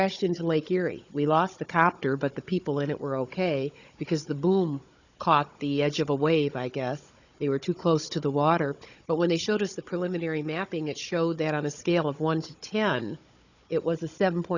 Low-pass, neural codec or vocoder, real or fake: 7.2 kHz; codec, 16 kHz, 16 kbps, FunCodec, trained on Chinese and English, 50 frames a second; fake